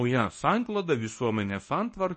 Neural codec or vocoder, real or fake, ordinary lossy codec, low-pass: codec, 24 kHz, 0.9 kbps, WavTokenizer, medium speech release version 1; fake; MP3, 32 kbps; 10.8 kHz